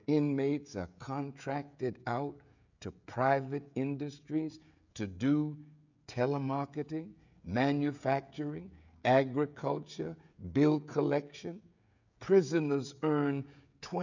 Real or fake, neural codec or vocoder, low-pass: fake; codec, 16 kHz, 16 kbps, FreqCodec, smaller model; 7.2 kHz